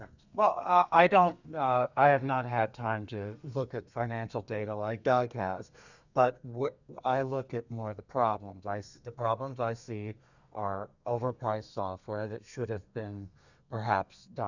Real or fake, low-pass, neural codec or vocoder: fake; 7.2 kHz; codec, 32 kHz, 1.9 kbps, SNAC